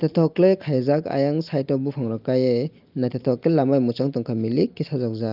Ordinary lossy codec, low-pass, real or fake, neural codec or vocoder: Opus, 24 kbps; 5.4 kHz; real; none